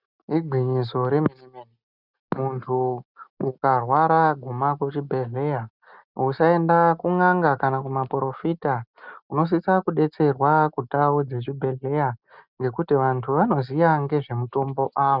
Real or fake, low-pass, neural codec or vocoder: real; 5.4 kHz; none